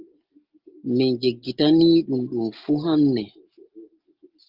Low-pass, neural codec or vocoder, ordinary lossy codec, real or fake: 5.4 kHz; none; Opus, 32 kbps; real